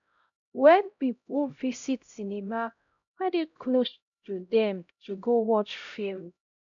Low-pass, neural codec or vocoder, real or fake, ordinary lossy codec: 7.2 kHz; codec, 16 kHz, 0.5 kbps, X-Codec, HuBERT features, trained on LibriSpeech; fake; none